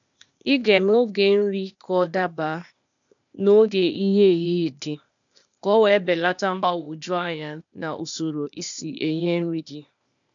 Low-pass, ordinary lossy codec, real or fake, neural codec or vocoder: 7.2 kHz; none; fake; codec, 16 kHz, 0.8 kbps, ZipCodec